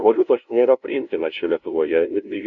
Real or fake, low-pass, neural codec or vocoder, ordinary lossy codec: fake; 7.2 kHz; codec, 16 kHz, 0.5 kbps, FunCodec, trained on LibriTTS, 25 frames a second; MP3, 64 kbps